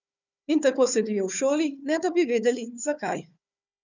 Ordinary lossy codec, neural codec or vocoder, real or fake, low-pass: none; codec, 16 kHz, 4 kbps, FunCodec, trained on Chinese and English, 50 frames a second; fake; 7.2 kHz